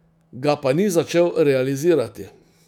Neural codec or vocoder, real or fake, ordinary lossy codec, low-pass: autoencoder, 48 kHz, 128 numbers a frame, DAC-VAE, trained on Japanese speech; fake; none; 19.8 kHz